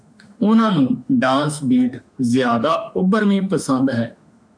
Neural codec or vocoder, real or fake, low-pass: autoencoder, 48 kHz, 32 numbers a frame, DAC-VAE, trained on Japanese speech; fake; 9.9 kHz